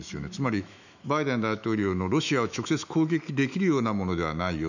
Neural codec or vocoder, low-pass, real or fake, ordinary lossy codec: autoencoder, 48 kHz, 128 numbers a frame, DAC-VAE, trained on Japanese speech; 7.2 kHz; fake; none